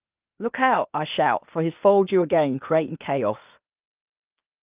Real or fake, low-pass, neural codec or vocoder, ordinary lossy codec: fake; 3.6 kHz; codec, 16 kHz, 0.8 kbps, ZipCodec; Opus, 24 kbps